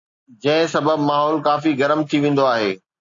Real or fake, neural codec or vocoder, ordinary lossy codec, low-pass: real; none; AAC, 48 kbps; 7.2 kHz